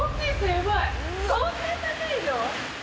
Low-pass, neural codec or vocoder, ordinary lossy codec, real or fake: none; none; none; real